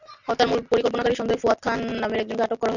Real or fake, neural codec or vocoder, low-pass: real; none; 7.2 kHz